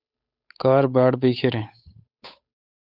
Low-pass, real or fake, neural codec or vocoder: 5.4 kHz; fake; codec, 16 kHz, 8 kbps, FunCodec, trained on Chinese and English, 25 frames a second